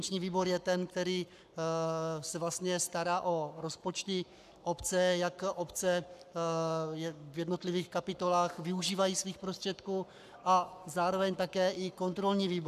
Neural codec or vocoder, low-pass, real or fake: codec, 44.1 kHz, 7.8 kbps, Pupu-Codec; 14.4 kHz; fake